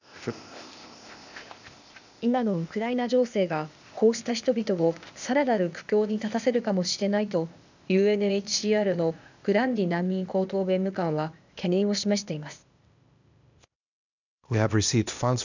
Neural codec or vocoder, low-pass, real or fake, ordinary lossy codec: codec, 16 kHz, 0.8 kbps, ZipCodec; 7.2 kHz; fake; none